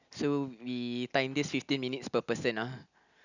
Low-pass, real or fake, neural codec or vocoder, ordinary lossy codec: 7.2 kHz; real; none; none